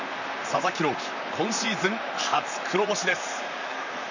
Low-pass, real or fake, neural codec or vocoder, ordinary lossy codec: 7.2 kHz; fake; vocoder, 44.1 kHz, 128 mel bands, Pupu-Vocoder; none